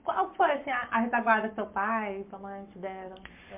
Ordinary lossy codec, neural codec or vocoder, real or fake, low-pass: MP3, 32 kbps; codec, 44.1 kHz, 7.8 kbps, Pupu-Codec; fake; 3.6 kHz